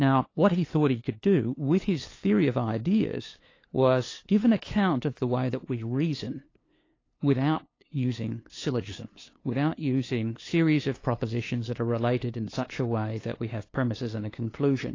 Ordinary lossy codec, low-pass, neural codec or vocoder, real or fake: AAC, 32 kbps; 7.2 kHz; codec, 16 kHz, 2 kbps, FunCodec, trained on LibriTTS, 25 frames a second; fake